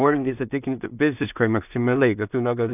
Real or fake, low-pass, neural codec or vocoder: fake; 3.6 kHz; codec, 16 kHz in and 24 kHz out, 0.4 kbps, LongCat-Audio-Codec, two codebook decoder